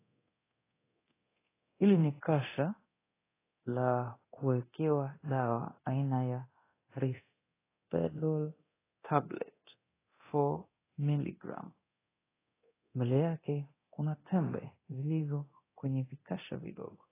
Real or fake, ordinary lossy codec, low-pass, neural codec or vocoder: fake; AAC, 16 kbps; 3.6 kHz; codec, 24 kHz, 0.9 kbps, DualCodec